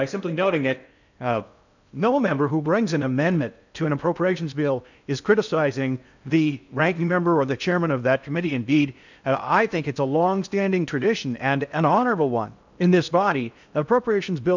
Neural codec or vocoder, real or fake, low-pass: codec, 16 kHz in and 24 kHz out, 0.6 kbps, FocalCodec, streaming, 2048 codes; fake; 7.2 kHz